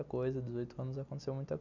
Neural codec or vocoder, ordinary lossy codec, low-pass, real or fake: none; none; 7.2 kHz; real